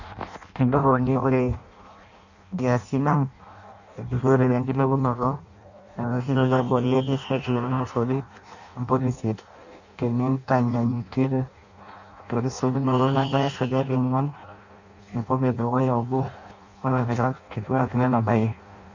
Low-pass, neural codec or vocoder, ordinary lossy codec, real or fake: 7.2 kHz; codec, 16 kHz in and 24 kHz out, 0.6 kbps, FireRedTTS-2 codec; none; fake